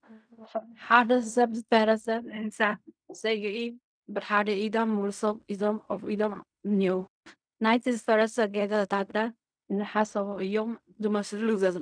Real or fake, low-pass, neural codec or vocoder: fake; 9.9 kHz; codec, 16 kHz in and 24 kHz out, 0.4 kbps, LongCat-Audio-Codec, fine tuned four codebook decoder